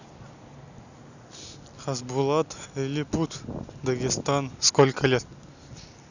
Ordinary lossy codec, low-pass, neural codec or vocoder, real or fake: none; 7.2 kHz; none; real